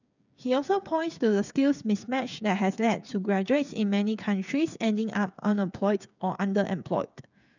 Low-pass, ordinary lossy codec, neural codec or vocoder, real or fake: 7.2 kHz; none; codec, 16 kHz, 8 kbps, FreqCodec, smaller model; fake